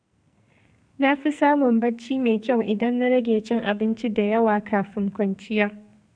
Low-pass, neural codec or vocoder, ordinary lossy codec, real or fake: 9.9 kHz; codec, 44.1 kHz, 2.6 kbps, SNAC; none; fake